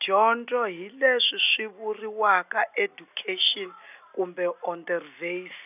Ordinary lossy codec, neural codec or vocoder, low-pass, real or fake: none; none; 3.6 kHz; real